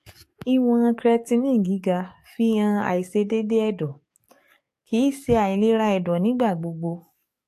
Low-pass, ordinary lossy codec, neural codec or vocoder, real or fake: 14.4 kHz; AAC, 64 kbps; codec, 44.1 kHz, 7.8 kbps, DAC; fake